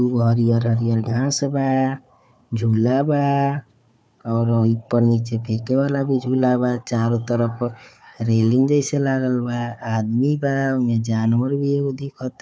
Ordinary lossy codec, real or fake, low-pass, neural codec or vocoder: none; fake; none; codec, 16 kHz, 4 kbps, FunCodec, trained on Chinese and English, 50 frames a second